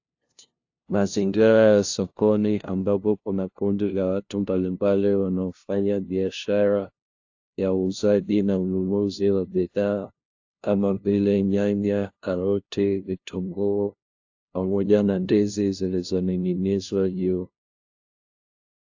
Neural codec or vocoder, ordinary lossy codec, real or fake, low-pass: codec, 16 kHz, 0.5 kbps, FunCodec, trained on LibriTTS, 25 frames a second; AAC, 48 kbps; fake; 7.2 kHz